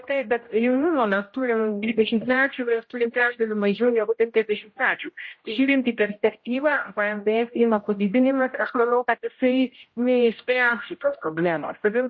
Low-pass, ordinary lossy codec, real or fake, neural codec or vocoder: 7.2 kHz; MP3, 32 kbps; fake; codec, 16 kHz, 0.5 kbps, X-Codec, HuBERT features, trained on general audio